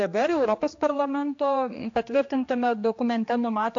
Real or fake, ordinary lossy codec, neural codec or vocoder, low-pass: fake; AAC, 48 kbps; codec, 16 kHz, 2 kbps, X-Codec, HuBERT features, trained on general audio; 7.2 kHz